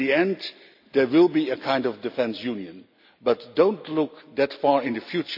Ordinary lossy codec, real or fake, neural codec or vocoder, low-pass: AAC, 48 kbps; real; none; 5.4 kHz